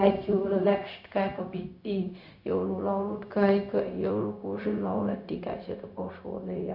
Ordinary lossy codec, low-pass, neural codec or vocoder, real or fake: none; 5.4 kHz; codec, 16 kHz, 0.4 kbps, LongCat-Audio-Codec; fake